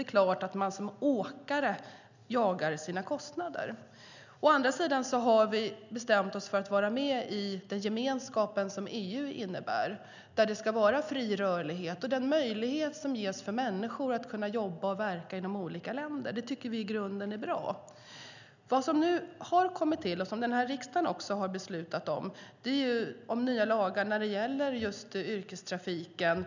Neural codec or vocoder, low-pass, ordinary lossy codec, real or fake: none; 7.2 kHz; none; real